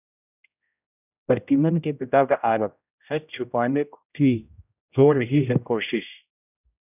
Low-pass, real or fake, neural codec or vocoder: 3.6 kHz; fake; codec, 16 kHz, 0.5 kbps, X-Codec, HuBERT features, trained on general audio